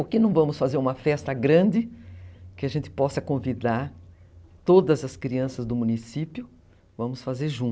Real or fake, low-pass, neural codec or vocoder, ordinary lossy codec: real; none; none; none